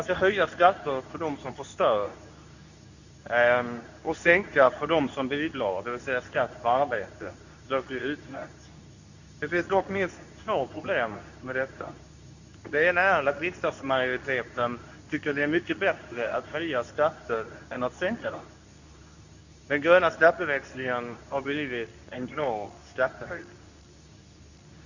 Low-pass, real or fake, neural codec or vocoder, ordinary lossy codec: 7.2 kHz; fake; codec, 24 kHz, 0.9 kbps, WavTokenizer, medium speech release version 2; none